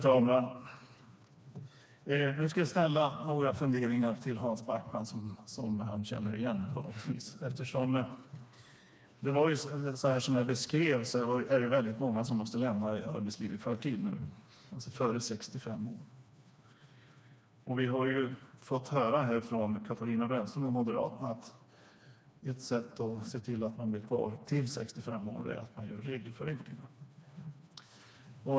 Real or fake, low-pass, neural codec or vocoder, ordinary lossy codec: fake; none; codec, 16 kHz, 2 kbps, FreqCodec, smaller model; none